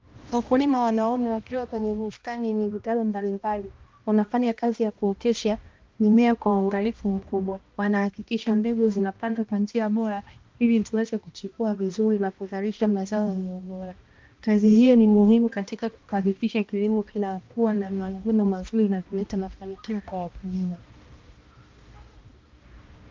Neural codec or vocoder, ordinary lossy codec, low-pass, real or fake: codec, 16 kHz, 1 kbps, X-Codec, HuBERT features, trained on balanced general audio; Opus, 24 kbps; 7.2 kHz; fake